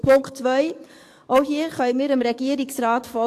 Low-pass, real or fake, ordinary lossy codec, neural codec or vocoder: 14.4 kHz; fake; AAC, 64 kbps; codec, 44.1 kHz, 7.8 kbps, DAC